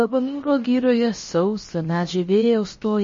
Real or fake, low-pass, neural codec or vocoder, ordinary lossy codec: fake; 7.2 kHz; codec, 16 kHz, about 1 kbps, DyCAST, with the encoder's durations; MP3, 32 kbps